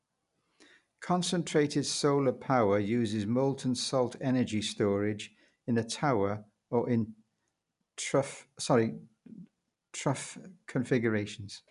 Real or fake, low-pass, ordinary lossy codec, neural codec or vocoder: real; 10.8 kHz; none; none